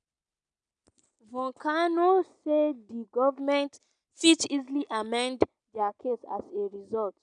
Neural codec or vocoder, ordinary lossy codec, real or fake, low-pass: none; none; real; 9.9 kHz